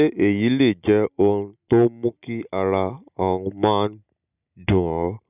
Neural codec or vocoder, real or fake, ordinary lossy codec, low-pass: none; real; none; 3.6 kHz